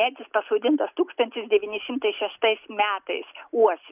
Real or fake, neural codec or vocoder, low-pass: real; none; 3.6 kHz